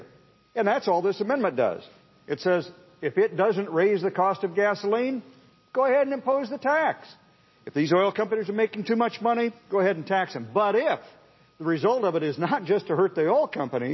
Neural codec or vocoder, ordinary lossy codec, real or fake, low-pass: none; MP3, 24 kbps; real; 7.2 kHz